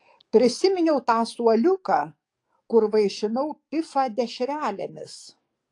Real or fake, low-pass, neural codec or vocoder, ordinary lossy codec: fake; 10.8 kHz; codec, 44.1 kHz, 7.8 kbps, DAC; AAC, 48 kbps